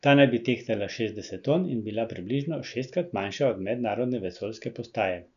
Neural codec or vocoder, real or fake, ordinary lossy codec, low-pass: none; real; none; 7.2 kHz